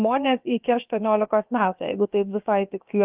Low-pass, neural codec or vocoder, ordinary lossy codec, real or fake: 3.6 kHz; codec, 16 kHz, about 1 kbps, DyCAST, with the encoder's durations; Opus, 32 kbps; fake